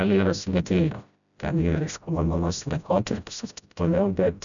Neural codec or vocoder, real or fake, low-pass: codec, 16 kHz, 0.5 kbps, FreqCodec, smaller model; fake; 7.2 kHz